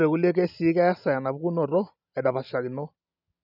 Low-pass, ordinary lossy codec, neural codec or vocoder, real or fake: 5.4 kHz; none; none; real